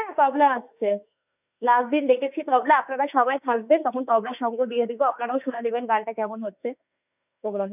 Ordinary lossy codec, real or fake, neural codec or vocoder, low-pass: none; fake; autoencoder, 48 kHz, 32 numbers a frame, DAC-VAE, trained on Japanese speech; 3.6 kHz